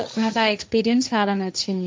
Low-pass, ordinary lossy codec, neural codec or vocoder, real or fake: none; none; codec, 16 kHz, 1.1 kbps, Voila-Tokenizer; fake